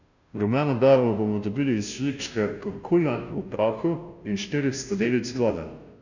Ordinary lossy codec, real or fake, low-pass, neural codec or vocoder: none; fake; 7.2 kHz; codec, 16 kHz, 0.5 kbps, FunCodec, trained on Chinese and English, 25 frames a second